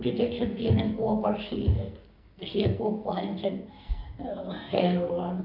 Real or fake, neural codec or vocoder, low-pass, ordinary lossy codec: fake; codec, 44.1 kHz, 2.6 kbps, SNAC; 5.4 kHz; none